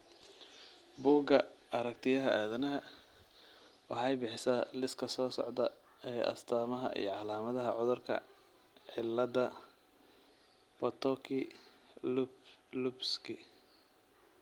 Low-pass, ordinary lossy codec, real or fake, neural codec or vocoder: 19.8 kHz; Opus, 24 kbps; real; none